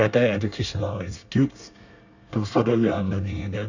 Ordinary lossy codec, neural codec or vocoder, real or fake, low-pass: Opus, 64 kbps; codec, 24 kHz, 1 kbps, SNAC; fake; 7.2 kHz